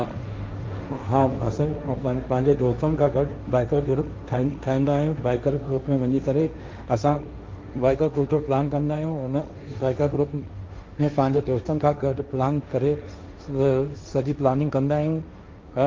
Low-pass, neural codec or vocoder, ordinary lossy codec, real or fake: 7.2 kHz; codec, 16 kHz, 1.1 kbps, Voila-Tokenizer; Opus, 24 kbps; fake